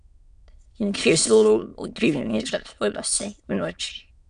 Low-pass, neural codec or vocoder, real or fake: 9.9 kHz; autoencoder, 22.05 kHz, a latent of 192 numbers a frame, VITS, trained on many speakers; fake